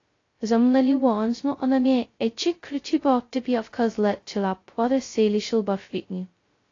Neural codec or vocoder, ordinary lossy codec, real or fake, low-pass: codec, 16 kHz, 0.2 kbps, FocalCodec; AAC, 32 kbps; fake; 7.2 kHz